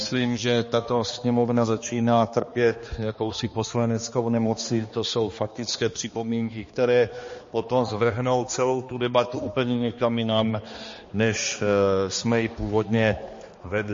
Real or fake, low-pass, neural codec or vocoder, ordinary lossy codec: fake; 7.2 kHz; codec, 16 kHz, 2 kbps, X-Codec, HuBERT features, trained on balanced general audio; MP3, 32 kbps